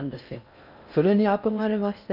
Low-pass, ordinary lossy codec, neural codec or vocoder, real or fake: 5.4 kHz; none; codec, 16 kHz in and 24 kHz out, 0.6 kbps, FocalCodec, streaming, 4096 codes; fake